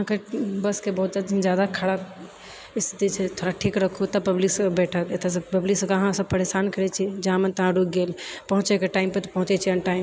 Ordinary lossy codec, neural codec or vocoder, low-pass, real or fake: none; none; none; real